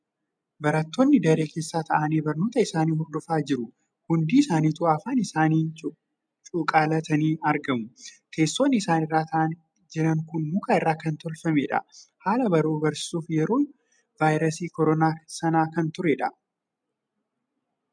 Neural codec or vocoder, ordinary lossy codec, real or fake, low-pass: none; MP3, 96 kbps; real; 9.9 kHz